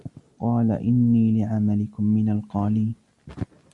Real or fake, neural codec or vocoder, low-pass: real; none; 10.8 kHz